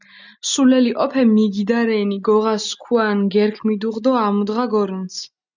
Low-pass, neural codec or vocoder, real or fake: 7.2 kHz; none; real